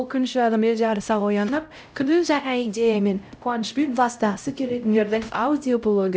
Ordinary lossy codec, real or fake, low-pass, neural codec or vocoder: none; fake; none; codec, 16 kHz, 0.5 kbps, X-Codec, HuBERT features, trained on LibriSpeech